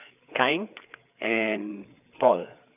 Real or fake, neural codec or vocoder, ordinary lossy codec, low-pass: fake; codec, 16 kHz, 4 kbps, FreqCodec, larger model; none; 3.6 kHz